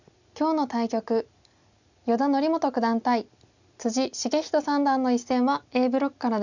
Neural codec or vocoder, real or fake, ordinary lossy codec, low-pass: none; real; none; 7.2 kHz